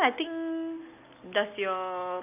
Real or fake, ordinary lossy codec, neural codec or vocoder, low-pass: real; none; none; 3.6 kHz